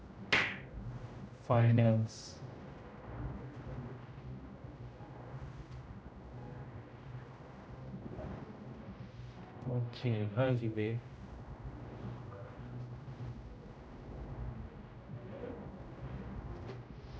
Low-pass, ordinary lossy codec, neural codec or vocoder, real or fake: none; none; codec, 16 kHz, 0.5 kbps, X-Codec, HuBERT features, trained on general audio; fake